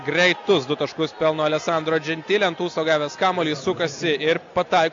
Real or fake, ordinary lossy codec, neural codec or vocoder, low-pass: real; AAC, 48 kbps; none; 7.2 kHz